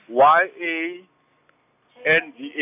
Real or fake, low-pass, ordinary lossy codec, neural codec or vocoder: real; 3.6 kHz; none; none